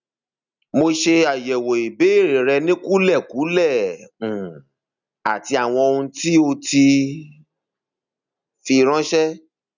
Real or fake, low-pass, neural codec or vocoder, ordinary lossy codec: real; 7.2 kHz; none; none